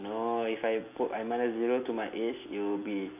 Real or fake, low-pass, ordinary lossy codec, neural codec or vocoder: real; 3.6 kHz; none; none